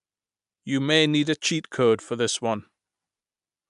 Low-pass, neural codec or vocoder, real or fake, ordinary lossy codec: 10.8 kHz; none; real; MP3, 96 kbps